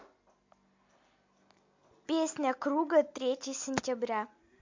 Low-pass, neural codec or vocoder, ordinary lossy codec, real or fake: 7.2 kHz; none; MP3, 48 kbps; real